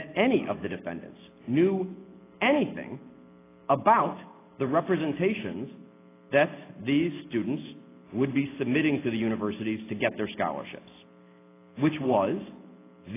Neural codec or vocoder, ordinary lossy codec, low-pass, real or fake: none; AAC, 16 kbps; 3.6 kHz; real